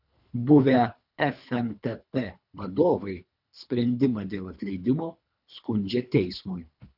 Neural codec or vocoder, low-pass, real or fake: codec, 24 kHz, 3 kbps, HILCodec; 5.4 kHz; fake